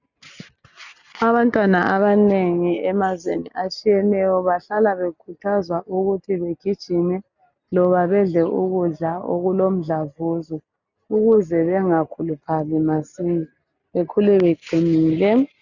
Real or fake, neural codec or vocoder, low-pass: real; none; 7.2 kHz